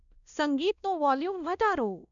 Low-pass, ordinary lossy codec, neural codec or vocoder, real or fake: 7.2 kHz; none; codec, 16 kHz, 1 kbps, X-Codec, WavLM features, trained on Multilingual LibriSpeech; fake